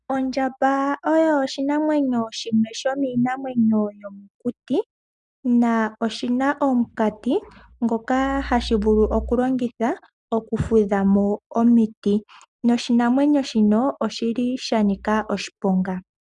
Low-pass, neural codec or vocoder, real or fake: 10.8 kHz; none; real